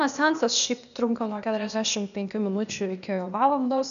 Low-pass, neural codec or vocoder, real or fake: 7.2 kHz; codec, 16 kHz, 0.8 kbps, ZipCodec; fake